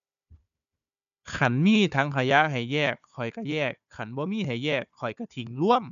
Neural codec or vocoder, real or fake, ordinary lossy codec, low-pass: codec, 16 kHz, 4 kbps, FunCodec, trained on Chinese and English, 50 frames a second; fake; none; 7.2 kHz